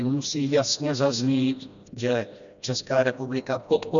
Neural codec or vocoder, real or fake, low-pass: codec, 16 kHz, 1 kbps, FreqCodec, smaller model; fake; 7.2 kHz